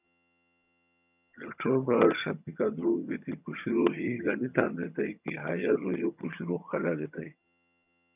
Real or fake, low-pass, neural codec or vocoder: fake; 3.6 kHz; vocoder, 22.05 kHz, 80 mel bands, HiFi-GAN